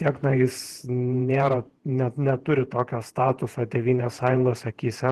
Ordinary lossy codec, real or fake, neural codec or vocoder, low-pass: Opus, 16 kbps; fake; vocoder, 48 kHz, 128 mel bands, Vocos; 14.4 kHz